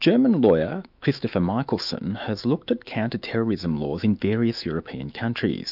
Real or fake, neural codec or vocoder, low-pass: fake; autoencoder, 48 kHz, 128 numbers a frame, DAC-VAE, trained on Japanese speech; 5.4 kHz